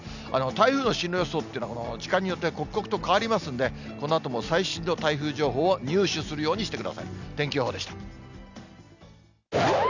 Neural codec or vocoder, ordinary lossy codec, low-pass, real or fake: none; none; 7.2 kHz; real